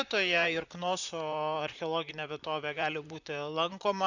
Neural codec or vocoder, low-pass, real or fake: vocoder, 44.1 kHz, 128 mel bands, Pupu-Vocoder; 7.2 kHz; fake